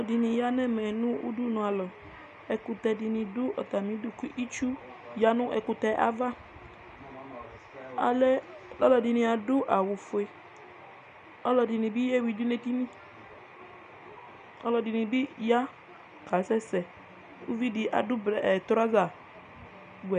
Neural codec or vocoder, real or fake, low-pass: none; real; 9.9 kHz